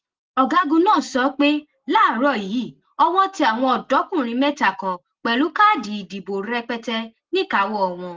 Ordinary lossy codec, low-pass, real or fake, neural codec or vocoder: Opus, 16 kbps; 7.2 kHz; real; none